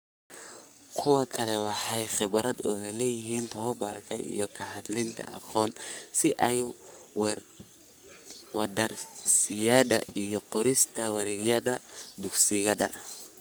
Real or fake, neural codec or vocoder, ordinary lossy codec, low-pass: fake; codec, 44.1 kHz, 3.4 kbps, Pupu-Codec; none; none